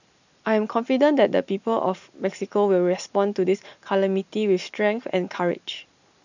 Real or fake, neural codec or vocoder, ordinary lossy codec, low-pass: real; none; none; 7.2 kHz